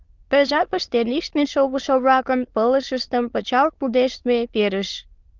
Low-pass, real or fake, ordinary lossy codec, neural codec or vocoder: 7.2 kHz; fake; Opus, 24 kbps; autoencoder, 22.05 kHz, a latent of 192 numbers a frame, VITS, trained on many speakers